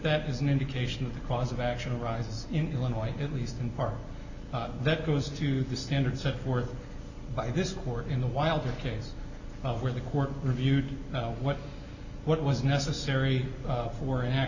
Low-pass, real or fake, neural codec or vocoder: 7.2 kHz; real; none